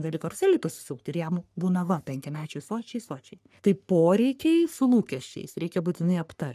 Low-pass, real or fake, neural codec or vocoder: 14.4 kHz; fake; codec, 44.1 kHz, 3.4 kbps, Pupu-Codec